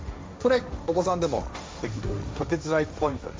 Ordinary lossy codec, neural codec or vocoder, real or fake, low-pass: none; codec, 16 kHz, 1.1 kbps, Voila-Tokenizer; fake; none